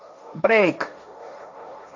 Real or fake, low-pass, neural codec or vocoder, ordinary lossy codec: fake; 7.2 kHz; codec, 16 kHz, 1.1 kbps, Voila-Tokenizer; MP3, 64 kbps